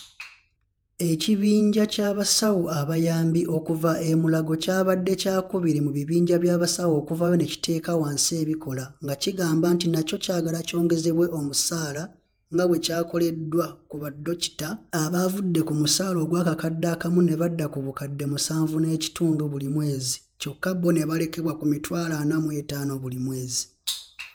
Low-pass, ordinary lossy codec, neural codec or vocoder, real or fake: none; none; vocoder, 48 kHz, 128 mel bands, Vocos; fake